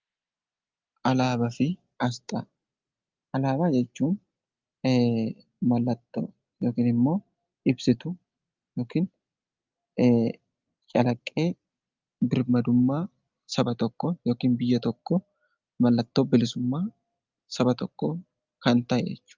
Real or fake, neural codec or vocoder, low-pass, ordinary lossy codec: real; none; 7.2 kHz; Opus, 24 kbps